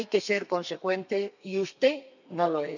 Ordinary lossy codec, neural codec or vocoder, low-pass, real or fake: none; codec, 32 kHz, 1.9 kbps, SNAC; 7.2 kHz; fake